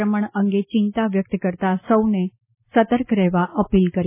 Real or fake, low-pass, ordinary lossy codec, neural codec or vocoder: real; 3.6 kHz; MP3, 16 kbps; none